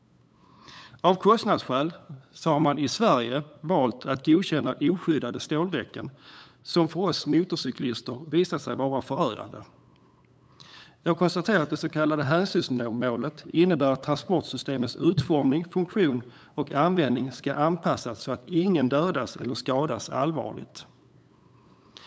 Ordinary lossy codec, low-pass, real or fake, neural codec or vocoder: none; none; fake; codec, 16 kHz, 8 kbps, FunCodec, trained on LibriTTS, 25 frames a second